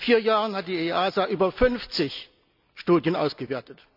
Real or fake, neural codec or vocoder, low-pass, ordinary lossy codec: real; none; 5.4 kHz; none